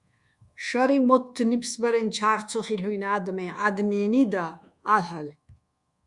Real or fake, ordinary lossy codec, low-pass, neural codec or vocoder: fake; Opus, 64 kbps; 10.8 kHz; codec, 24 kHz, 1.2 kbps, DualCodec